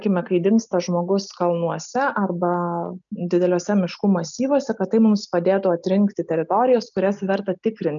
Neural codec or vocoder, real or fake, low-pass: none; real; 7.2 kHz